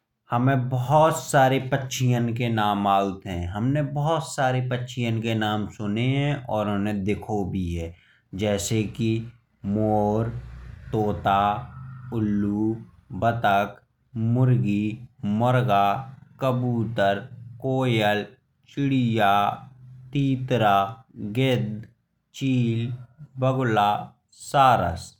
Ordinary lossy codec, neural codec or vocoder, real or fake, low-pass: none; none; real; 19.8 kHz